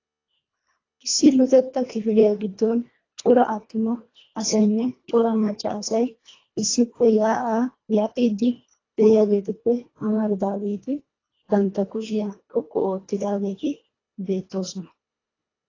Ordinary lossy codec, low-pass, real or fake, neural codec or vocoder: AAC, 32 kbps; 7.2 kHz; fake; codec, 24 kHz, 1.5 kbps, HILCodec